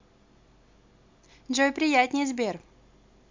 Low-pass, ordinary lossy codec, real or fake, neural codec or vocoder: 7.2 kHz; MP3, 64 kbps; real; none